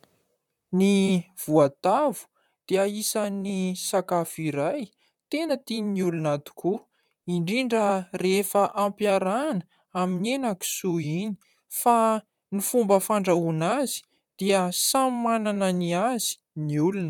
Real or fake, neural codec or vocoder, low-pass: fake; vocoder, 44.1 kHz, 128 mel bands, Pupu-Vocoder; 19.8 kHz